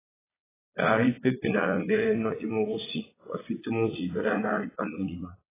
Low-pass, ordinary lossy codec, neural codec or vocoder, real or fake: 3.6 kHz; AAC, 16 kbps; vocoder, 22.05 kHz, 80 mel bands, Vocos; fake